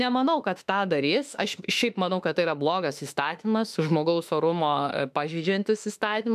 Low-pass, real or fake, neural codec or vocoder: 14.4 kHz; fake; autoencoder, 48 kHz, 32 numbers a frame, DAC-VAE, trained on Japanese speech